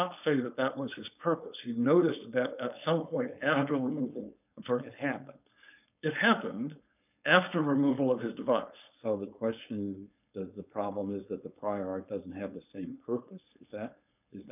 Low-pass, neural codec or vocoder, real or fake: 3.6 kHz; codec, 16 kHz, 4.8 kbps, FACodec; fake